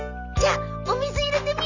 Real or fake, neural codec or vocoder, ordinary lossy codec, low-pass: real; none; none; 7.2 kHz